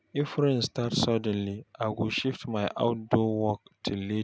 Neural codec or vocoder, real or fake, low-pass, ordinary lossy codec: none; real; none; none